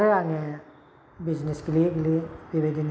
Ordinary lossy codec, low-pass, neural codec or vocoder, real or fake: none; none; none; real